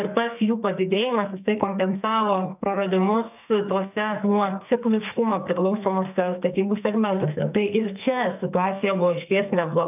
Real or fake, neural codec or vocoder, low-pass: fake; codec, 44.1 kHz, 2.6 kbps, SNAC; 3.6 kHz